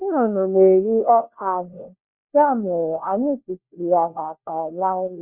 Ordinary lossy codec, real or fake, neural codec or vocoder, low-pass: none; fake; codec, 16 kHz, 1 kbps, FunCodec, trained on LibriTTS, 50 frames a second; 3.6 kHz